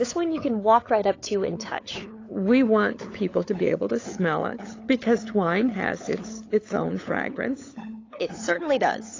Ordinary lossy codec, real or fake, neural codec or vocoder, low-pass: AAC, 32 kbps; fake; codec, 16 kHz, 8 kbps, FunCodec, trained on LibriTTS, 25 frames a second; 7.2 kHz